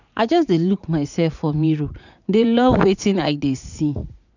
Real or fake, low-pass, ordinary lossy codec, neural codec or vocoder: fake; 7.2 kHz; none; vocoder, 44.1 kHz, 80 mel bands, Vocos